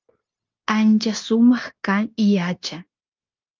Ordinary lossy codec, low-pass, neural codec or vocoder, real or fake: Opus, 24 kbps; 7.2 kHz; codec, 16 kHz, 0.9 kbps, LongCat-Audio-Codec; fake